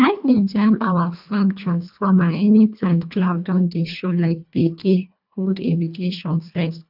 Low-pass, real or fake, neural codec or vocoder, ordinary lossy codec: 5.4 kHz; fake; codec, 24 kHz, 1.5 kbps, HILCodec; none